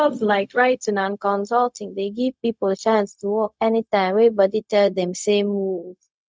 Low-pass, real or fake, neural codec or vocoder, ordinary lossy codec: none; fake; codec, 16 kHz, 0.4 kbps, LongCat-Audio-Codec; none